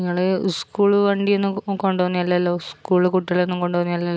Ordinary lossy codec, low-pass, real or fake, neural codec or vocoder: none; none; real; none